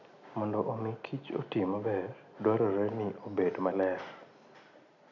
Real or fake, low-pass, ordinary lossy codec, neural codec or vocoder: real; 7.2 kHz; none; none